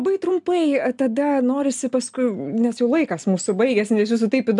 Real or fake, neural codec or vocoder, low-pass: real; none; 10.8 kHz